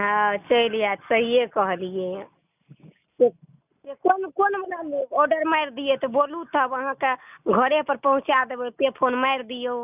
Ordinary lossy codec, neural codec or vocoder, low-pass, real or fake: none; none; 3.6 kHz; real